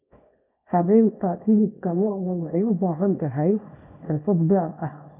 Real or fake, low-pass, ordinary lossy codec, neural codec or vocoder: fake; 3.6 kHz; none; codec, 24 kHz, 0.9 kbps, WavTokenizer, small release